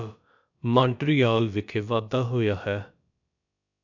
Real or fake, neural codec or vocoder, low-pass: fake; codec, 16 kHz, about 1 kbps, DyCAST, with the encoder's durations; 7.2 kHz